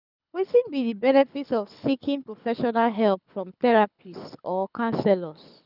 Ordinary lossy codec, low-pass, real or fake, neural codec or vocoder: none; 5.4 kHz; fake; codec, 24 kHz, 6 kbps, HILCodec